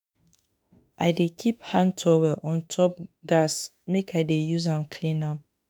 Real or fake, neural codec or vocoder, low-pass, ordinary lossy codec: fake; autoencoder, 48 kHz, 32 numbers a frame, DAC-VAE, trained on Japanese speech; none; none